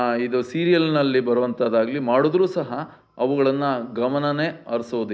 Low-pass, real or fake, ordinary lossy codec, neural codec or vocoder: none; real; none; none